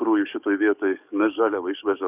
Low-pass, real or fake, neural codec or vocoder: 3.6 kHz; fake; codec, 44.1 kHz, 7.8 kbps, DAC